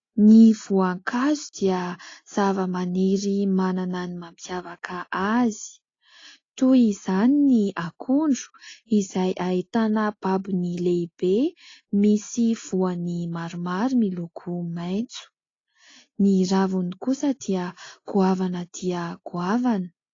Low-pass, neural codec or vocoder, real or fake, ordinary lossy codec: 7.2 kHz; none; real; AAC, 32 kbps